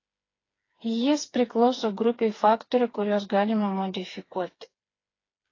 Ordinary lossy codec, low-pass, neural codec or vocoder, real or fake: AAC, 32 kbps; 7.2 kHz; codec, 16 kHz, 4 kbps, FreqCodec, smaller model; fake